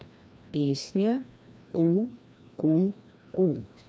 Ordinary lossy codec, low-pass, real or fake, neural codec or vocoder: none; none; fake; codec, 16 kHz, 1 kbps, FreqCodec, larger model